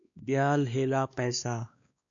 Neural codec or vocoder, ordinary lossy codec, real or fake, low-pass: codec, 16 kHz, 2 kbps, X-Codec, WavLM features, trained on Multilingual LibriSpeech; AAC, 64 kbps; fake; 7.2 kHz